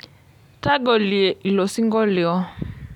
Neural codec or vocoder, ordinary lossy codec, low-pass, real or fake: none; none; 19.8 kHz; real